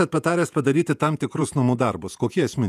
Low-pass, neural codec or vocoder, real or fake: 14.4 kHz; vocoder, 48 kHz, 128 mel bands, Vocos; fake